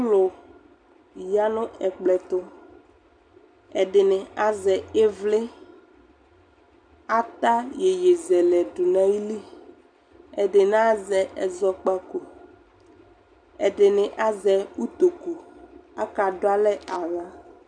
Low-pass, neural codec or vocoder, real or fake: 9.9 kHz; none; real